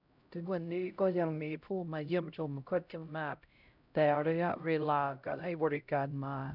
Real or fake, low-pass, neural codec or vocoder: fake; 5.4 kHz; codec, 16 kHz, 0.5 kbps, X-Codec, HuBERT features, trained on LibriSpeech